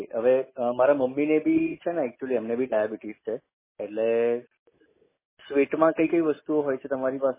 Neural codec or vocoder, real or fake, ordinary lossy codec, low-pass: none; real; MP3, 16 kbps; 3.6 kHz